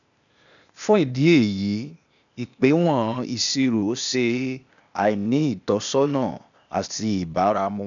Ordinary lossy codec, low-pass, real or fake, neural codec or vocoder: none; 7.2 kHz; fake; codec, 16 kHz, 0.8 kbps, ZipCodec